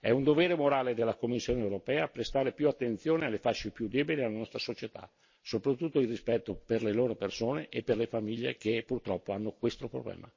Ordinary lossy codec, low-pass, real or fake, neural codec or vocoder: MP3, 32 kbps; 7.2 kHz; real; none